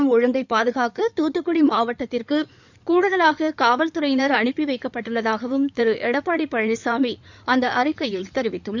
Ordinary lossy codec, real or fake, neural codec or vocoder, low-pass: none; fake; codec, 16 kHz in and 24 kHz out, 2.2 kbps, FireRedTTS-2 codec; 7.2 kHz